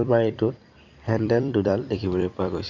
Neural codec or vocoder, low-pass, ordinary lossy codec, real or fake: vocoder, 44.1 kHz, 128 mel bands every 256 samples, BigVGAN v2; 7.2 kHz; none; fake